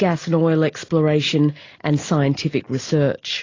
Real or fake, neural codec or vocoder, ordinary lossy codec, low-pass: real; none; AAC, 32 kbps; 7.2 kHz